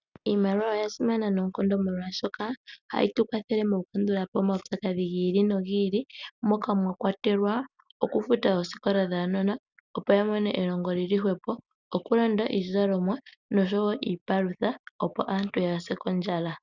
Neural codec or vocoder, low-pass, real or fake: none; 7.2 kHz; real